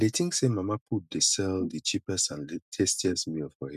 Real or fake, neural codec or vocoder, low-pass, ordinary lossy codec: real; none; 14.4 kHz; none